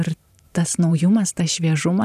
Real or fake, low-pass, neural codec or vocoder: fake; 14.4 kHz; vocoder, 44.1 kHz, 128 mel bands every 512 samples, BigVGAN v2